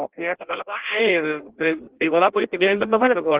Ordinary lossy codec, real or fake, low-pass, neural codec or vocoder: Opus, 16 kbps; fake; 3.6 kHz; codec, 16 kHz in and 24 kHz out, 0.6 kbps, FireRedTTS-2 codec